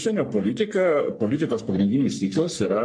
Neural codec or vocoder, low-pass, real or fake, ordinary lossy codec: codec, 44.1 kHz, 3.4 kbps, Pupu-Codec; 9.9 kHz; fake; MP3, 64 kbps